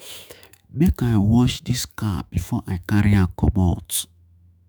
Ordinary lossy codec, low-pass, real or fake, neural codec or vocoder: none; none; fake; autoencoder, 48 kHz, 128 numbers a frame, DAC-VAE, trained on Japanese speech